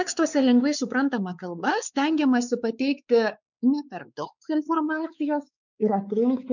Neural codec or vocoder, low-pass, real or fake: codec, 16 kHz, 4 kbps, X-Codec, WavLM features, trained on Multilingual LibriSpeech; 7.2 kHz; fake